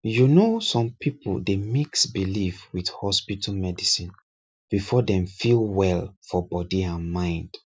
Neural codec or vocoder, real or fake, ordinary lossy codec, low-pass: none; real; none; none